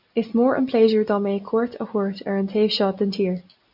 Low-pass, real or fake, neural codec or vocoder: 5.4 kHz; real; none